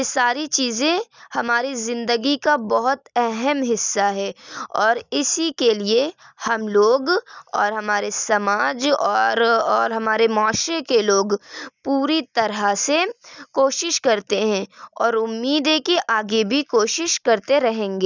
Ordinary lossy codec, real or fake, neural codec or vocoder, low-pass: none; real; none; 7.2 kHz